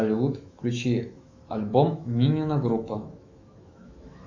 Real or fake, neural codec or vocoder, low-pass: fake; autoencoder, 48 kHz, 128 numbers a frame, DAC-VAE, trained on Japanese speech; 7.2 kHz